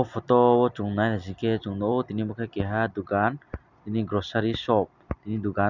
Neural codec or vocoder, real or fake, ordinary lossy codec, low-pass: none; real; none; 7.2 kHz